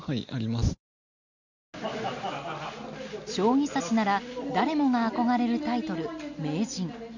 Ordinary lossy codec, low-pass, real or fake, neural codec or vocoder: none; 7.2 kHz; real; none